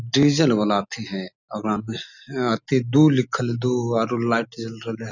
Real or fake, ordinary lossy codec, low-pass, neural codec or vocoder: real; none; 7.2 kHz; none